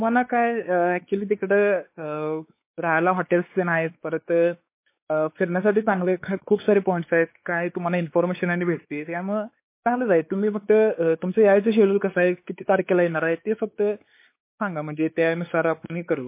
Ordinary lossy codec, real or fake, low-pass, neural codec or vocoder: MP3, 24 kbps; fake; 3.6 kHz; codec, 16 kHz, 4 kbps, X-Codec, WavLM features, trained on Multilingual LibriSpeech